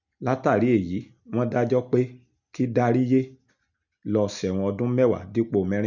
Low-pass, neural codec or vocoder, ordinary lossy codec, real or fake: 7.2 kHz; none; none; real